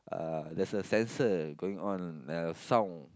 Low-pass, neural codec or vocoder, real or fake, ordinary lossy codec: none; none; real; none